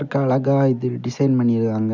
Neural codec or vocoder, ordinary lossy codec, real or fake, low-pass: none; none; real; 7.2 kHz